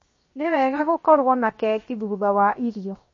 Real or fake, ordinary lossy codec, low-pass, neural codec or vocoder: fake; MP3, 32 kbps; 7.2 kHz; codec, 16 kHz, 0.7 kbps, FocalCodec